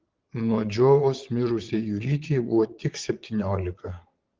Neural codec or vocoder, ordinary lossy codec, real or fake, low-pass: vocoder, 22.05 kHz, 80 mel bands, Vocos; Opus, 16 kbps; fake; 7.2 kHz